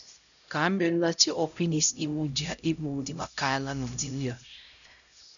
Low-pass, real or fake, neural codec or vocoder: 7.2 kHz; fake; codec, 16 kHz, 0.5 kbps, X-Codec, HuBERT features, trained on LibriSpeech